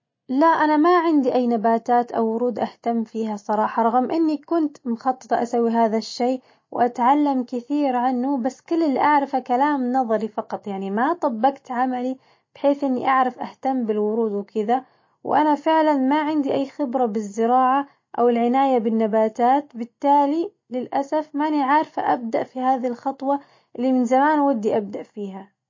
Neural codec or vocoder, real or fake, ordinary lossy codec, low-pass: none; real; MP3, 32 kbps; 7.2 kHz